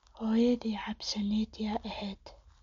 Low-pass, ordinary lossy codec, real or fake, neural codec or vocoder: 7.2 kHz; MP3, 48 kbps; real; none